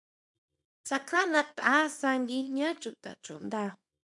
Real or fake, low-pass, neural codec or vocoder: fake; 10.8 kHz; codec, 24 kHz, 0.9 kbps, WavTokenizer, small release